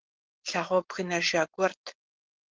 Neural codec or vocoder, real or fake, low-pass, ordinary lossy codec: none; real; 7.2 kHz; Opus, 16 kbps